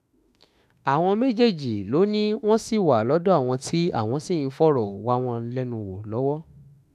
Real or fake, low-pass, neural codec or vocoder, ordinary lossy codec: fake; 14.4 kHz; autoencoder, 48 kHz, 32 numbers a frame, DAC-VAE, trained on Japanese speech; none